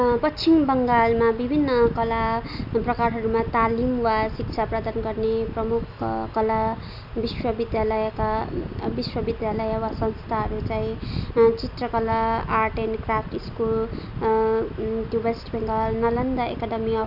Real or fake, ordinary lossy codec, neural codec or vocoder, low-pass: real; none; none; 5.4 kHz